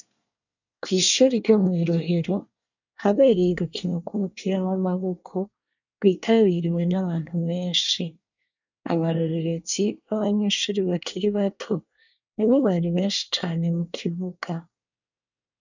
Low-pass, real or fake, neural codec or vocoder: 7.2 kHz; fake; codec, 24 kHz, 1 kbps, SNAC